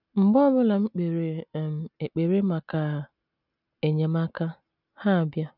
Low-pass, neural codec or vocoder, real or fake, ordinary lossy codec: 5.4 kHz; none; real; none